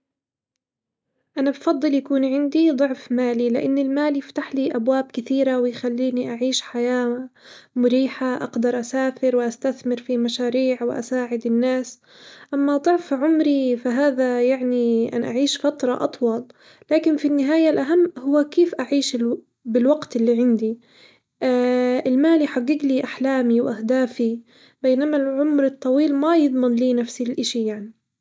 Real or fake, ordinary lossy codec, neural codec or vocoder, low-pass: real; none; none; none